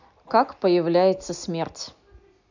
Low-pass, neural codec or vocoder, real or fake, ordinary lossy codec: 7.2 kHz; none; real; none